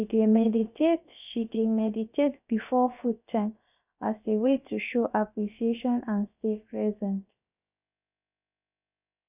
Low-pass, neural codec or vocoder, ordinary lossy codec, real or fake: 3.6 kHz; codec, 16 kHz, about 1 kbps, DyCAST, with the encoder's durations; none; fake